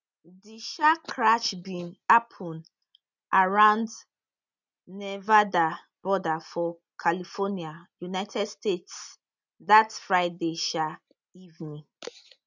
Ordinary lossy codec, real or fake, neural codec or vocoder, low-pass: none; real; none; 7.2 kHz